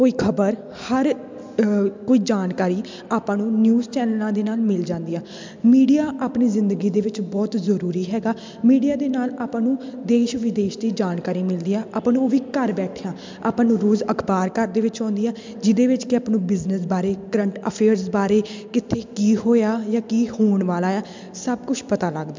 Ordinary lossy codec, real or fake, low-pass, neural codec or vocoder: MP3, 64 kbps; real; 7.2 kHz; none